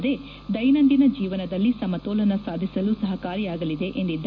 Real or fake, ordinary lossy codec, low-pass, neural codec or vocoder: real; none; 7.2 kHz; none